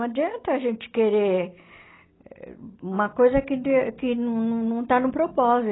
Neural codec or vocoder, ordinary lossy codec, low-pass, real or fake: none; AAC, 16 kbps; 7.2 kHz; real